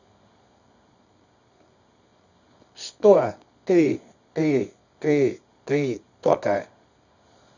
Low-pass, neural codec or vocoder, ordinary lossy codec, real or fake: 7.2 kHz; codec, 24 kHz, 0.9 kbps, WavTokenizer, medium music audio release; none; fake